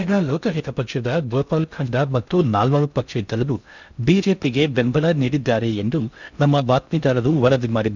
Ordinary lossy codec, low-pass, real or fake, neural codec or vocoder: none; 7.2 kHz; fake; codec, 16 kHz in and 24 kHz out, 0.6 kbps, FocalCodec, streaming, 2048 codes